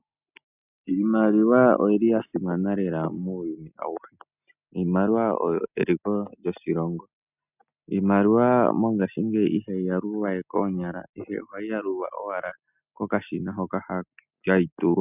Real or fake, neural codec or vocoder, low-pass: real; none; 3.6 kHz